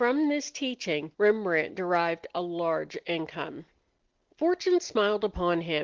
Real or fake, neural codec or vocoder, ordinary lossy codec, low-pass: real; none; Opus, 16 kbps; 7.2 kHz